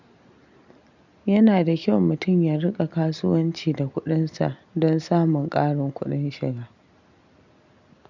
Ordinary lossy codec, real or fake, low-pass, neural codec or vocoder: none; real; 7.2 kHz; none